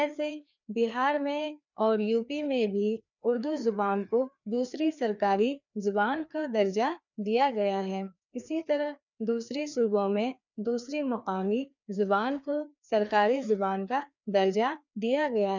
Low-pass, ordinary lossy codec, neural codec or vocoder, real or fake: 7.2 kHz; none; codec, 16 kHz, 2 kbps, FreqCodec, larger model; fake